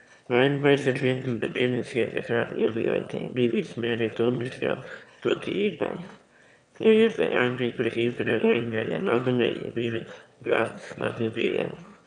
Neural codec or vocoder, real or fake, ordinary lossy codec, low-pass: autoencoder, 22.05 kHz, a latent of 192 numbers a frame, VITS, trained on one speaker; fake; none; 9.9 kHz